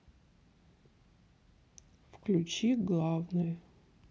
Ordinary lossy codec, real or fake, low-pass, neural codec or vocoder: none; real; none; none